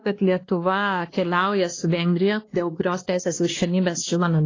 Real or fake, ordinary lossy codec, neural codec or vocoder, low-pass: fake; AAC, 32 kbps; codec, 16 kHz, 1 kbps, X-Codec, WavLM features, trained on Multilingual LibriSpeech; 7.2 kHz